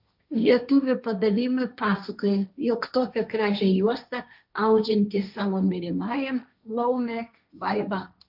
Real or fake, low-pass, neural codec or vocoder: fake; 5.4 kHz; codec, 16 kHz, 1.1 kbps, Voila-Tokenizer